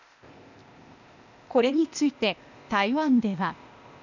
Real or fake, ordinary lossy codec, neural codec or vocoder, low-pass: fake; none; codec, 16 kHz, 0.8 kbps, ZipCodec; 7.2 kHz